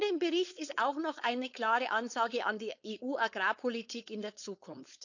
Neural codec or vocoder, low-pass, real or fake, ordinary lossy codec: codec, 16 kHz, 4.8 kbps, FACodec; 7.2 kHz; fake; none